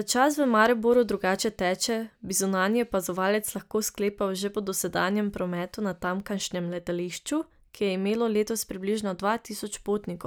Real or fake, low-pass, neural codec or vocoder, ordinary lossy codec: real; none; none; none